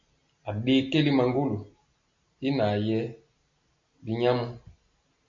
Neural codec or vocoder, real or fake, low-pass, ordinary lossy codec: none; real; 7.2 kHz; MP3, 64 kbps